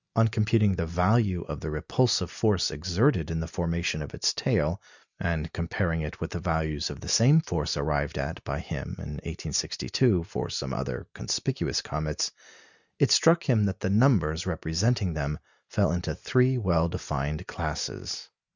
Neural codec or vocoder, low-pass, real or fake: none; 7.2 kHz; real